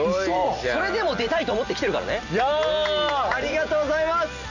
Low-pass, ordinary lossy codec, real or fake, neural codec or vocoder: 7.2 kHz; none; real; none